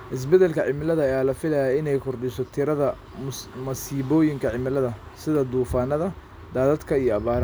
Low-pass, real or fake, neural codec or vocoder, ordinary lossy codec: none; real; none; none